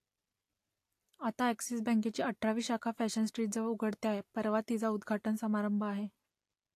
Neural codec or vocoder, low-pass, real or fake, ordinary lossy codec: none; 14.4 kHz; real; AAC, 64 kbps